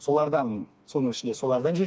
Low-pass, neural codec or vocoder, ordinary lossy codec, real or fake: none; codec, 16 kHz, 2 kbps, FreqCodec, smaller model; none; fake